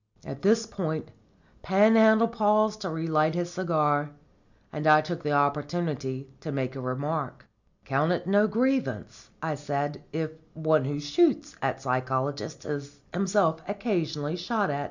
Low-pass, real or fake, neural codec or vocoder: 7.2 kHz; real; none